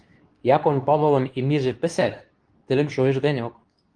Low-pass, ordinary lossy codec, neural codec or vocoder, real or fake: 9.9 kHz; Opus, 24 kbps; codec, 24 kHz, 0.9 kbps, WavTokenizer, medium speech release version 2; fake